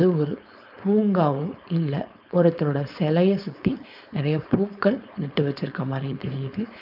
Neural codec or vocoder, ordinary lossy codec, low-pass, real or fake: codec, 16 kHz, 4.8 kbps, FACodec; none; 5.4 kHz; fake